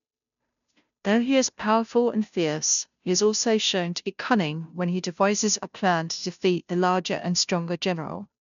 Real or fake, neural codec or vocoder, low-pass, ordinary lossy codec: fake; codec, 16 kHz, 0.5 kbps, FunCodec, trained on Chinese and English, 25 frames a second; 7.2 kHz; none